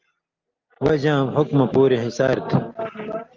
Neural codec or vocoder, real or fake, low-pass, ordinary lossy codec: none; real; 7.2 kHz; Opus, 32 kbps